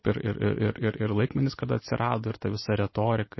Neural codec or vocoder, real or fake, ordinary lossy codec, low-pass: none; real; MP3, 24 kbps; 7.2 kHz